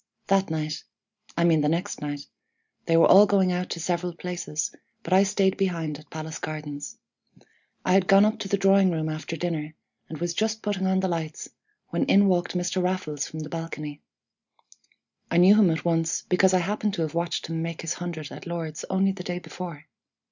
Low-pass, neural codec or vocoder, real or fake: 7.2 kHz; none; real